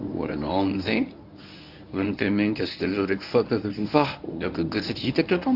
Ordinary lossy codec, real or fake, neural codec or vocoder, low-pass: AAC, 48 kbps; fake; codec, 24 kHz, 0.9 kbps, WavTokenizer, medium speech release version 1; 5.4 kHz